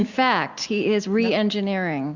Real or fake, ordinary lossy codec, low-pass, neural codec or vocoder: real; Opus, 64 kbps; 7.2 kHz; none